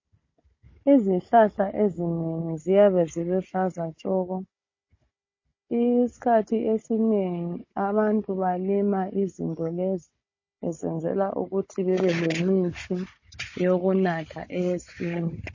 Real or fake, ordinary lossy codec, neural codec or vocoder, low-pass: fake; MP3, 32 kbps; codec, 16 kHz, 16 kbps, FunCodec, trained on Chinese and English, 50 frames a second; 7.2 kHz